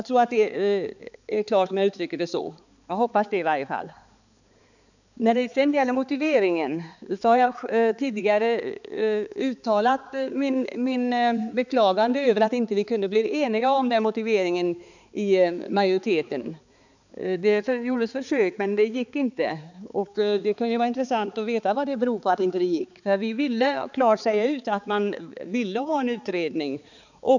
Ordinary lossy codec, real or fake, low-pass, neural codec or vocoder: none; fake; 7.2 kHz; codec, 16 kHz, 4 kbps, X-Codec, HuBERT features, trained on balanced general audio